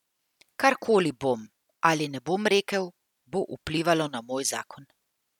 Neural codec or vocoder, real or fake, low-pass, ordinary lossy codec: none; real; 19.8 kHz; none